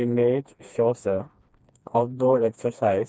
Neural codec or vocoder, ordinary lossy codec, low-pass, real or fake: codec, 16 kHz, 2 kbps, FreqCodec, smaller model; none; none; fake